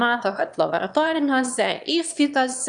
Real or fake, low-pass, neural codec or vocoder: fake; 9.9 kHz; autoencoder, 22.05 kHz, a latent of 192 numbers a frame, VITS, trained on one speaker